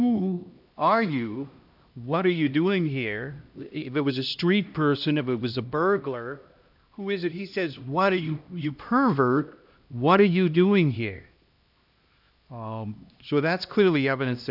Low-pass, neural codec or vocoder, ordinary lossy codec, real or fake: 5.4 kHz; codec, 16 kHz, 1 kbps, X-Codec, HuBERT features, trained on LibriSpeech; AAC, 48 kbps; fake